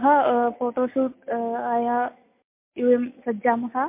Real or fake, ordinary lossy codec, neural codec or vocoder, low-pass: real; MP3, 24 kbps; none; 3.6 kHz